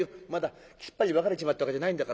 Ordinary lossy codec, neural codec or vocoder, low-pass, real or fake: none; none; none; real